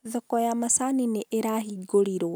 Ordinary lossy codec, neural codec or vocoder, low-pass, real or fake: none; none; none; real